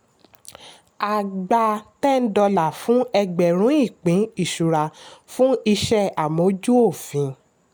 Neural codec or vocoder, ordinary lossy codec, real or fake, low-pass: none; none; real; none